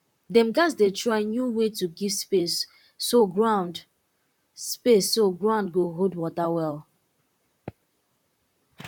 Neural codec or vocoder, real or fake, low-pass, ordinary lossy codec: vocoder, 44.1 kHz, 128 mel bands, Pupu-Vocoder; fake; 19.8 kHz; none